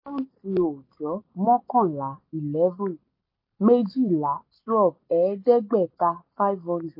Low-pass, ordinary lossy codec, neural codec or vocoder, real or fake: 5.4 kHz; MP3, 32 kbps; none; real